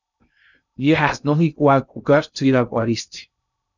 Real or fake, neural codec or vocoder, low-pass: fake; codec, 16 kHz in and 24 kHz out, 0.6 kbps, FocalCodec, streaming, 2048 codes; 7.2 kHz